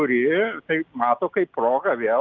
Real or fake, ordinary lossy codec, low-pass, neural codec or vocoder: real; Opus, 24 kbps; 7.2 kHz; none